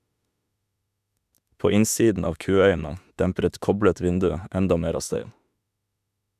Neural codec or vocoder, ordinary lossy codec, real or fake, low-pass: autoencoder, 48 kHz, 32 numbers a frame, DAC-VAE, trained on Japanese speech; none; fake; 14.4 kHz